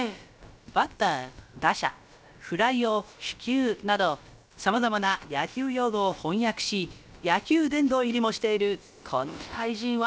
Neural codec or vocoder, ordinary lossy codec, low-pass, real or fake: codec, 16 kHz, about 1 kbps, DyCAST, with the encoder's durations; none; none; fake